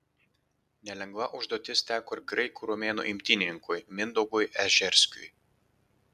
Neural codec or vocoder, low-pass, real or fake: none; 14.4 kHz; real